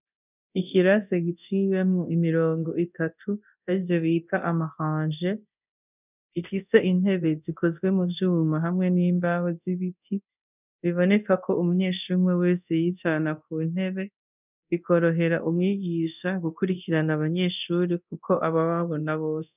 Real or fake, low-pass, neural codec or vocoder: fake; 3.6 kHz; codec, 24 kHz, 0.9 kbps, DualCodec